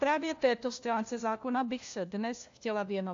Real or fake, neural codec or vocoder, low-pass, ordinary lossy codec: fake; codec, 16 kHz, 1 kbps, FunCodec, trained on LibriTTS, 50 frames a second; 7.2 kHz; AAC, 48 kbps